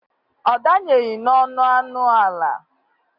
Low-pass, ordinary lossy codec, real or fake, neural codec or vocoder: 5.4 kHz; AAC, 48 kbps; real; none